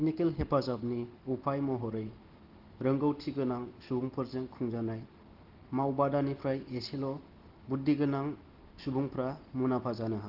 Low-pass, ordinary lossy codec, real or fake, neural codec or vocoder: 5.4 kHz; Opus, 16 kbps; real; none